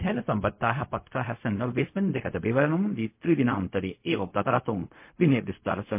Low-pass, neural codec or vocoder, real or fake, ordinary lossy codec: 3.6 kHz; codec, 16 kHz, 0.4 kbps, LongCat-Audio-Codec; fake; MP3, 24 kbps